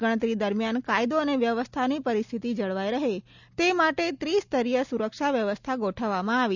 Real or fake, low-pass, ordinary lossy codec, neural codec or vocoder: real; 7.2 kHz; none; none